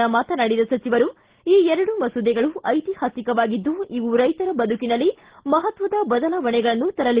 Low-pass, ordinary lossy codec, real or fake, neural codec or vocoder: 3.6 kHz; Opus, 16 kbps; real; none